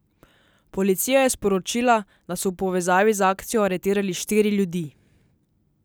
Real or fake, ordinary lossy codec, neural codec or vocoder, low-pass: real; none; none; none